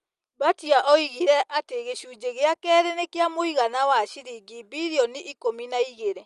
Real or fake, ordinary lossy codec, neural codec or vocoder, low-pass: real; Opus, 32 kbps; none; 10.8 kHz